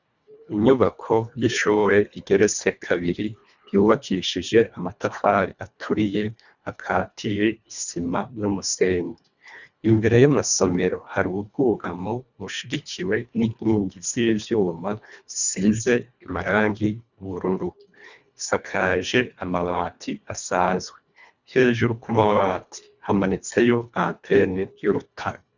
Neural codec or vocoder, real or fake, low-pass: codec, 24 kHz, 1.5 kbps, HILCodec; fake; 7.2 kHz